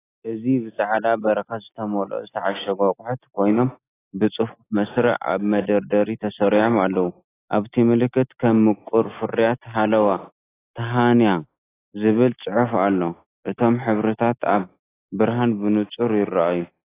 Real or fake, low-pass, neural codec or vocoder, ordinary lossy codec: real; 3.6 kHz; none; AAC, 16 kbps